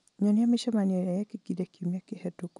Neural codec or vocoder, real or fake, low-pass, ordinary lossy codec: none; real; 10.8 kHz; none